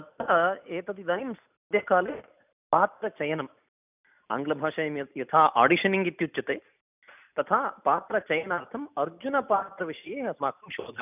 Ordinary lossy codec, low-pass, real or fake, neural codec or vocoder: none; 3.6 kHz; real; none